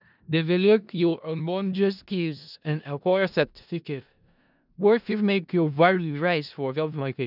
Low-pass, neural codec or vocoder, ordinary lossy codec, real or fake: 5.4 kHz; codec, 16 kHz in and 24 kHz out, 0.4 kbps, LongCat-Audio-Codec, four codebook decoder; none; fake